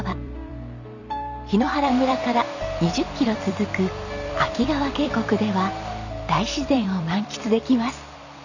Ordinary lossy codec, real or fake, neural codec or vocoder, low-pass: none; fake; vocoder, 44.1 kHz, 128 mel bands every 256 samples, BigVGAN v2; 7.2 kHz